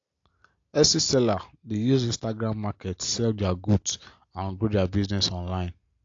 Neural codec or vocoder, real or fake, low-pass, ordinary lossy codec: none; real; 7.2 kHz; AAC, 48 kbps